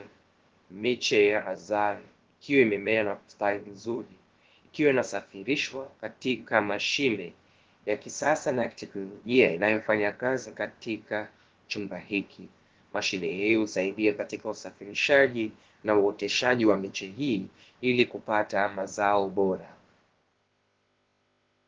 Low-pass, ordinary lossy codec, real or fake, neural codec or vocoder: 7.2 kHz; Opus, 16 kbps; fake; codec, 16 kHz, about 1 kbps, DyCAST, with the encoder's durations